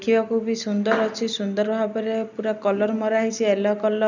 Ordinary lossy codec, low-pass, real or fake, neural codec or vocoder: none; 7.2 kHz; real; none